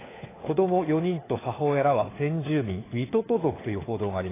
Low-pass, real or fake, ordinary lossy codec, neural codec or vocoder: 3.6 kHz; fake; AAC, 16 kbps; codec, 16 kHz, 4 kbps, FunCodec, trained on LibriTTS, 50 frames a second